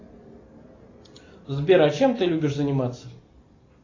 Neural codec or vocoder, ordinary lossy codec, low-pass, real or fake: none; AAC, 32 kbps; 7.2 kHz; real